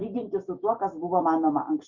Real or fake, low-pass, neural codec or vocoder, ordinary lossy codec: real; 7.2 kHz; none; Opus, 64 kbps